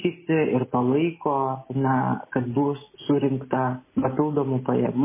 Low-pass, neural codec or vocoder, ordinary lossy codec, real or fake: 3.6 kHz; none; MP3, 16 kbps; real